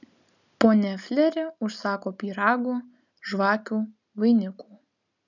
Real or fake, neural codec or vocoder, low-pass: real; none; 7.2 kHz